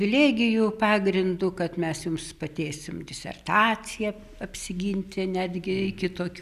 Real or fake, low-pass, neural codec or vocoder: real; 14.4 kHz; none